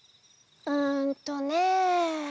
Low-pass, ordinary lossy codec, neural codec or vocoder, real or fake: none; none; none; real